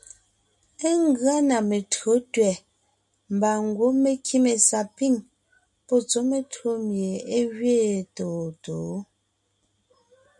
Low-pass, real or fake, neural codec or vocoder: 10.8 kHz; real; none